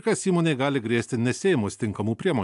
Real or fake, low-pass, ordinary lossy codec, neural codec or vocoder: real; 10.8 kHz; AAC, 96 kbps; none